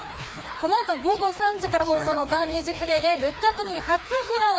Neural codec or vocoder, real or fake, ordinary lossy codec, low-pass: codec, 16 kHz, 2 kbps, FreqCodec, larger model; fake; none; none